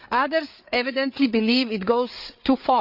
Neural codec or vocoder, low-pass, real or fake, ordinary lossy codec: codec, 16 kHz, 8 kbps, FreqCodec, larger model; 5.4 kHz; fake; Opus, 64 kbps